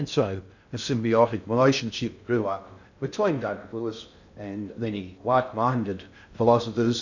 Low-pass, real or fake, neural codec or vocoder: 7.2 kHz; fake; codec, 16 kHz in and 24 kHz out, 0.6 kbps, FocalCodec, streaming, 2048 codes